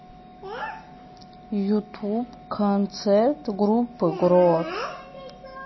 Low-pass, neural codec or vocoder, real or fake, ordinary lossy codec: 7.2 kHz; none; real; MP3, 24 kbps